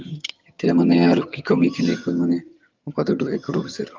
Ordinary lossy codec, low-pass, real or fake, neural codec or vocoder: Opus, 32 kbps; 7.2 kHz; fake; vocoder, 22.05 kHz, 80 mel bands, HiFi-GAN